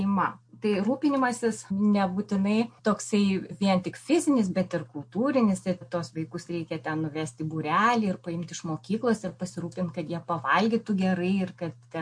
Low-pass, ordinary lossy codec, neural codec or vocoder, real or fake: 9.9 kHz; MP3, 48 kbps; none; real